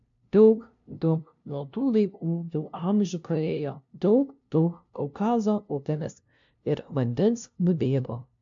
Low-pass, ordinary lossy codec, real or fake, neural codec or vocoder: 7.2 kHz; AAC, 64 kbps; fake; codec, 16 kHz, 0.5 kbps, FunCodec, trained on LibriTTS, 25 frames a second